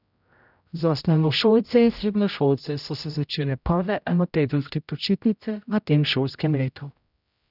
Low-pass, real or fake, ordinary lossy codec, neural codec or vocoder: 5.4 kHz; fake; none; codec, 16 kHz, 0.5 kbps, X-Codec, HuBERT features, trained on general audio